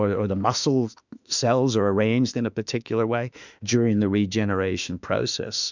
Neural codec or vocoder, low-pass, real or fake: autoencoder, 48 kHz, 32 numbers a frame, DAC-VAE, trained on Japanese speech; 7.2 kHz; fake